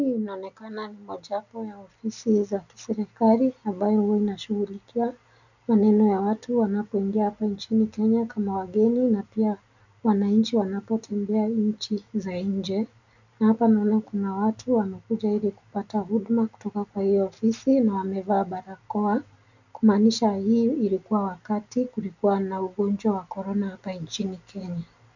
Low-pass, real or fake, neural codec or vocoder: 7.2 kHz; real; none